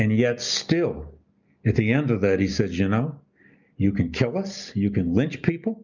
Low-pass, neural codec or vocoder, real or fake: 7.2 kHz; none; real